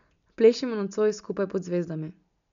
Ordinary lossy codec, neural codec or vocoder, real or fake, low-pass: none; none; real; 7.2 kHz